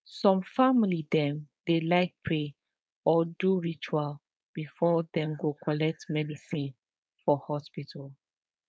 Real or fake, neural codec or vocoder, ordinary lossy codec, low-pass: fake; codec, 16 kHz, 4.8 kbps, FACodec; none; none